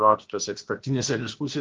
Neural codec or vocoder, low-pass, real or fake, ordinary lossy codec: codec, 16 kHz, about 1 kbps, DyCAST, with the encoder's durations; 7.2 kHz; fake; Opus, 16 kbps